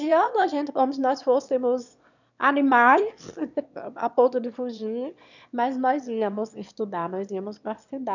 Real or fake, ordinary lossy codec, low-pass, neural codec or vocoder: fake; none; 7.2 kHz; autoencoder, 22.05 kHz, a latent of 192 numbers a frame, VITS, trained on one speaker